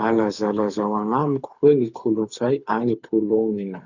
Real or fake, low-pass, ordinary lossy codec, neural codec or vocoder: fake; 7.2 kHz; none; codec, 24 kHz, 6 kbps, HILCodec